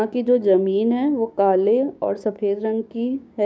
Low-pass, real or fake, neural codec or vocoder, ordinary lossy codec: none; fake; codec, 16 kHz, 6 kbps, DAC; none